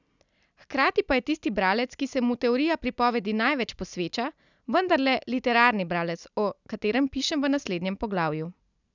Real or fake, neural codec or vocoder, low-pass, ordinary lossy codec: real; none; 7.2 kHz; none